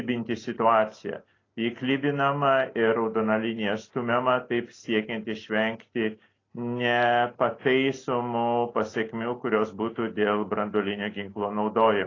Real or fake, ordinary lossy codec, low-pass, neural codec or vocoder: real; AAC, 32 kbps; 7.2 kHz; none